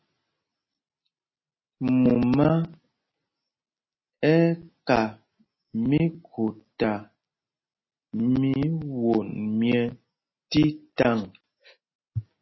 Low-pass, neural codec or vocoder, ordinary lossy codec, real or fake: 7.2 kHz; none; MP3, 24 kbps; real